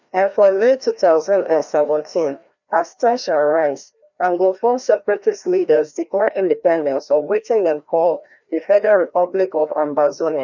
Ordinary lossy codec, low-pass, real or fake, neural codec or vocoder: none; 7.2 kHz; fake; codec, 16 kHz, 1 kbps, FreqCodec, larger model